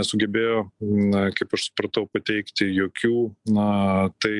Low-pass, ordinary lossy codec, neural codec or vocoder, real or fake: 10.8 kHz; MP3, 96 kbps; none; real